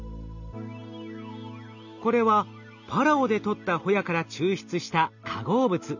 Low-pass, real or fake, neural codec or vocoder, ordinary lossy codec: 7.2 kHz; real; none; none